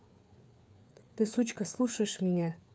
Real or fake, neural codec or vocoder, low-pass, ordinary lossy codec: fake; codec, 16 kHz, 4 kbps, FreqCodec, larger model; none; none